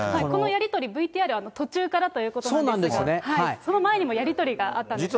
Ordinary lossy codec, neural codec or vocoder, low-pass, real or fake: none; none; none; real